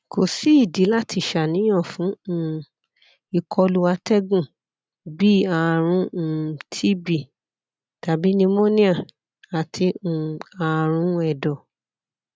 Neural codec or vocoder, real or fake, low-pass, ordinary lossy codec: none; real; none; none